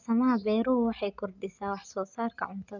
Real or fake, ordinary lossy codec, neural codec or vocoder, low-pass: real; none; none; 7.2 kHz